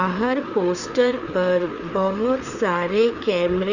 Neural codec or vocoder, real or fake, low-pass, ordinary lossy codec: codec, 16 kHz, 4 kbps, FreqCodec, larger model; fake; 7.2 kHz; none